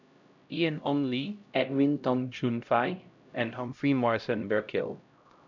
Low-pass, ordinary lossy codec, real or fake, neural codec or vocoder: 7.2 kHz; none; fake; codec, 16 kHz, 0.5 kbps, X-Codec, HuBERT features, trained on LibriSpeech